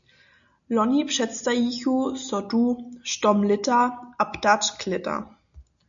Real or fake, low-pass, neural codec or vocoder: real; 7.2 kHz; none